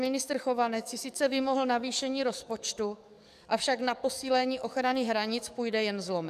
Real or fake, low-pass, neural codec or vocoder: fake; 14.4 kHz; codec, 44.1 kHz, 7.8 kbps, DAC